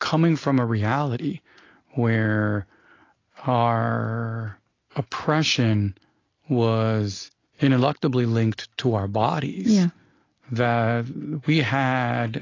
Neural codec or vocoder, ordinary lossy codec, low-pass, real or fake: none; AAC, 32 kbps; 7.2 kHz; real